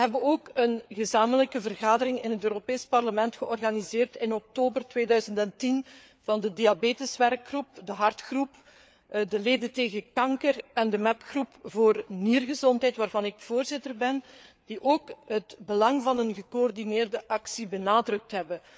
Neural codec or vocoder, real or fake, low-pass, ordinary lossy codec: codec, 16 kHz, 4 kbps, FreqCodec, larger model; fake; none; none